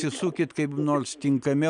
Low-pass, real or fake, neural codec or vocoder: 9.9 kHz; real; none